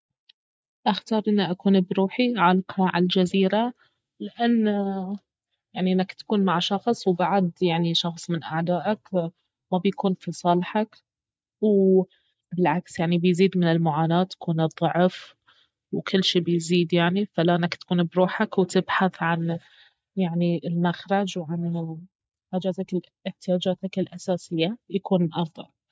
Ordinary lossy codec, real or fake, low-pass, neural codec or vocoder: none; real; none; none